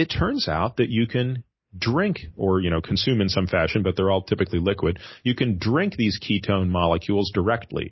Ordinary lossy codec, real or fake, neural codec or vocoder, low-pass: MP3, 24 kbps; real; none; 7.2 kHz